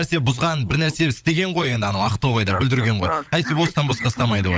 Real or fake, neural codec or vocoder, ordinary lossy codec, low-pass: fake; codec, 16 kHz, 16 kbps, FunCodec, trained on LibriTTS, 50 frames a second; none; none